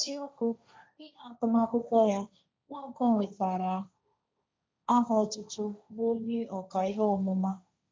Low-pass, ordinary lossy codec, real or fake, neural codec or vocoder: none; none; fake; codec, 16 kHz, 1.1 kbps, Voila-Tokenizer